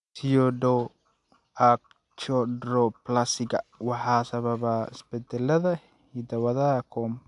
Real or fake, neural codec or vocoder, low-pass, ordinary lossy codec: real; none; 10.8 kHz; AAC, 64 kbps